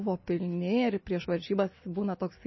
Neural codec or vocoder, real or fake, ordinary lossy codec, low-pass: none; real; MP3, 24 kbps; 7.2 kHz